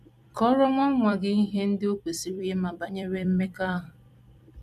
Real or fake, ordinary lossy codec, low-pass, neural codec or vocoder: fake; none; 14.4 kHz; vocoder, 44.1 kHz, 128 mel bands every 256 samples, BigVGAN v2